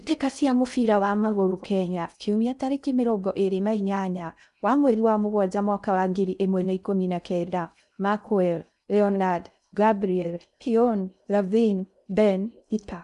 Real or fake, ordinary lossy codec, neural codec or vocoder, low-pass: fake; none; codec, 16 kHz in and 24 kHz out, 0.6 kbps, FocalCodec, streaming, 4096 codes; 10.8 kHz